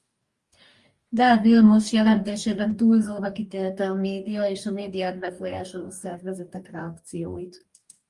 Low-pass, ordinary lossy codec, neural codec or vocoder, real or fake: 10.8 kHz; Opus, 32 kbps; codec, 44.1 kHz, 2.6 kbps, DAC; fake